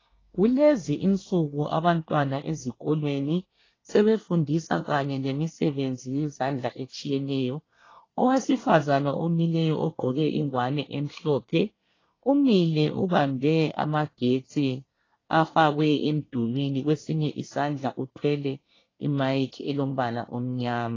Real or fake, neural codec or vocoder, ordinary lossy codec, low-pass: fake; codec, 24 kHz, 1 kbps, SNAC; AAC, 32 kbps; 7.2 kHz